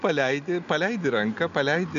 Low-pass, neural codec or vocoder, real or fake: 7.2 kHz; none; real